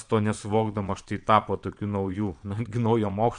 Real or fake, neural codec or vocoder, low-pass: fake; vocoder, 22.05 kHz, 80 mel bands, Vocos; 9.9 kHz